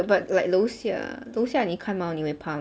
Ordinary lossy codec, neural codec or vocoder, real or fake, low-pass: none; none; real; none